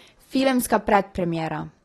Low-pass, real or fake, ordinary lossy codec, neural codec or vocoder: 19.8 kHz; real; AAC, 32 kbps; none